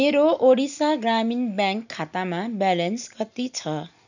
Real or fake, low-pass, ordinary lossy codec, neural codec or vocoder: real; 7.2 kHz; none; none